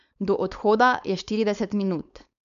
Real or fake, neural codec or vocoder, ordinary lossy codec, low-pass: fake; codec, 16 kHz, 4.8 kbps, FACodec; none; 7.2 kHz